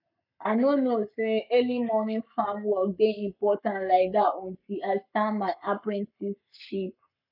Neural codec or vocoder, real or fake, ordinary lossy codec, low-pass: codec, 44.1 kHz, 7.8 kbps, Pupu-Codec; fake; none; 5.4 kHz